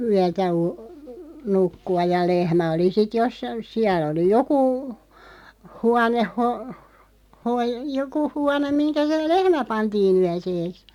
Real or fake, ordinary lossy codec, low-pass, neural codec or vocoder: real; none; 19.8 kHz; none